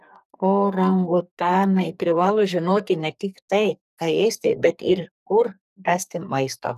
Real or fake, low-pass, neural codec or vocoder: fake; 14.4 kHz; codec, 44.1 kHz, 2.6 kbps, SNAC